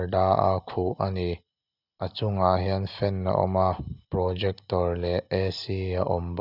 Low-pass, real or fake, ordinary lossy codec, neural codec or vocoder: 5.4 kHz; real; none; none